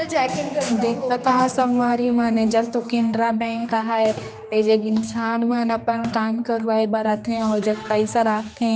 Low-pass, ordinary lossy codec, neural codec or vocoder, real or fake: none; none; codec, 16 kHz, 2 kbps, X-Codec, HuBERT features, trained on general audio; fake